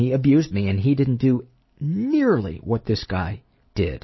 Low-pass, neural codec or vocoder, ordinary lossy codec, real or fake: 7.2 kHz; none; MP3, 24 kbps; real